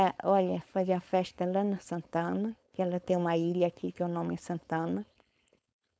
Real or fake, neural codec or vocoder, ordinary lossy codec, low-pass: fake; codec, 16 kHz, 4.8 kbps, FACodec; none; none